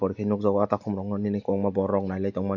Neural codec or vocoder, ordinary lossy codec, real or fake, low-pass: none; none; real; 7.2 kHz